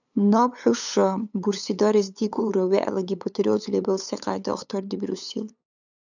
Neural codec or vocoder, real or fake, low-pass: codec, 16 kHz, 8 kbps, FunCodec, trained on LibriTTS, 25 frames a second; fake; 7.2 kHz